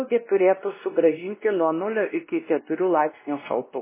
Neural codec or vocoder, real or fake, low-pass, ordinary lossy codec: codec, 16 kHz, 1 kbps, X-Codec, WavLM features, trained on Multilingual LibriSpeech; fake; 3.6 kHz; MP3, 16 kbps